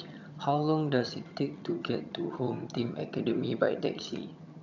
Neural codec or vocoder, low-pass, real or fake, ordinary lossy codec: vocoder, 22.05 kHz, 80 mel bands, HiFi-GAN; 7.2 kHz; fake; none